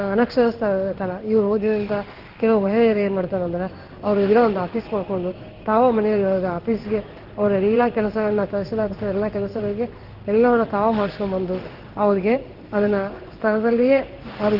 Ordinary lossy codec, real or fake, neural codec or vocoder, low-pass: Opus, 16 kbps; fake; codec, 16 kHz in and 24 kHz out, 1 kbps, XY-Tokenizer; 5.4 kHz